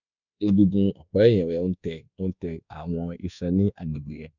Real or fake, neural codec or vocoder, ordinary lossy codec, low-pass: fake; codec, 24 kHz, 1.2 kbps, DualCodec; none; 7.2 kHz